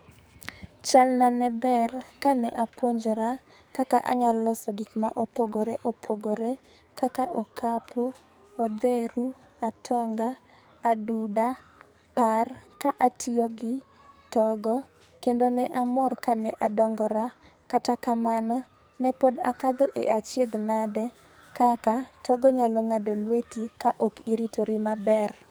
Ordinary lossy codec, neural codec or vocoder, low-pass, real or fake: none; codec, 44.1 kHz, 2.6 kbps, SNAC; none; fake